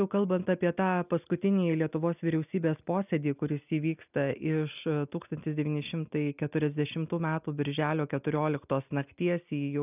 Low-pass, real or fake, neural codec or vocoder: 3.6 kHz; real; none